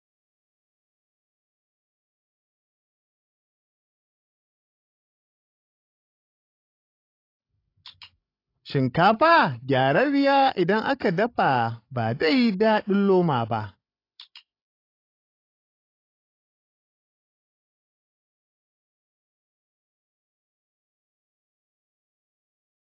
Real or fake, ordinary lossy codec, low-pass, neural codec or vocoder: fake; AAC, 32 kbps; 5.4 kHz; codec, 16 kHz, 16 kbps, FreqCodec, larger model